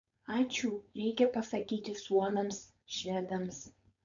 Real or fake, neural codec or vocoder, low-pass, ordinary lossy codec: fake; codec, 16 kHz, 4.8 kbps, FACodec; 7.2 kHz; MP3, 64 kbps